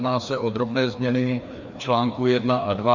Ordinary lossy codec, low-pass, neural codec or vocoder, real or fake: Opus, 64 kbps; 7.2 kHz; codec, 16 kHz, 2 kbps, FreqCodec, larger model; fake